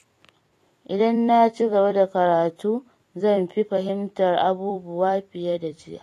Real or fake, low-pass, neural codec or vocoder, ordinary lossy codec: fake; 14.4 kHz; vocoder, 44.1 kHz, 128 mel bands every 256 samples, BigVGAN v2; AAC, 48 kbps